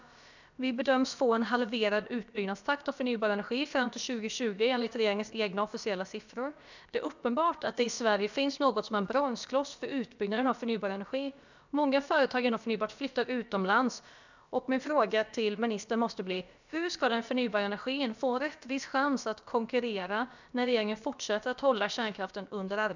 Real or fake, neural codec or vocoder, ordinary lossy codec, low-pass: fake; codec, 16 kHz, about 1 kbps, DyCAST, with the encoder's durations; none; 7.2 kHz